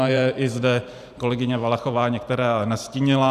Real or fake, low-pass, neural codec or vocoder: fake; 14.4 kHz; vocoder, 44.1 kHz, 128 mel bands every 512 samples, BigVGAN v2